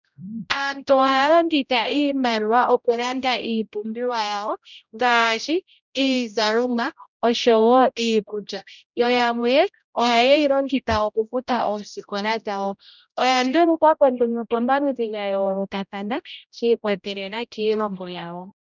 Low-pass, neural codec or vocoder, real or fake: 7.2 kHz; codec, 16 kHz, 0.5 kbps, X-Codec, HuBERT features, trained on general audio; fake